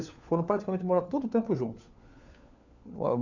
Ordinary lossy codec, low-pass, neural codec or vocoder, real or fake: none; 7.2 kHz; codec, 16 kHz, 4 kbps, FunCodec, trained on LibriTTS, 50 frames a second; fake